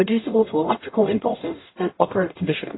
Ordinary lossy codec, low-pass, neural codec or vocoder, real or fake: AAC, 16 kbps; 7.2 kHz; codec, 44.1 kHz, 0.9 kbps, DAC; fake